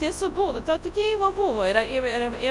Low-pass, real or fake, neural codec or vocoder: 10.8 kHz; fake; codec, 24 kHz, 0.9 kbps, WavTokenizer, large speech release